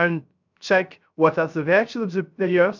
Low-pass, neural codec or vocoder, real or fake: 7.2 kHz; codec, 16 kHz, 0.3 kbps, FocalCodec; fake